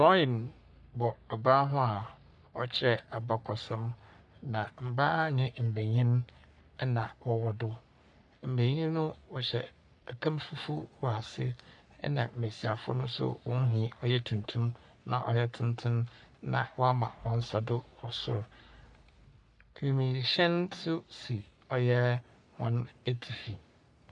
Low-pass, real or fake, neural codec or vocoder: 10.8 kHz; fake; codec, 44.1 kHz, 3.4 kbps, Pupu-Codec